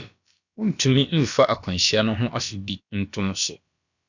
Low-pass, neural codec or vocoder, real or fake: 7.2 kHz; codec, 16 kHz, about 1 kbps, DyCAST, with the encoder's durations; fake